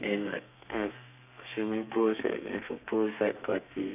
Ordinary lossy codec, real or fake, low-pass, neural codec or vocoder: none; fake; 3.6 kHz; codec, 32 kHz, 1.9 kbps, SNAC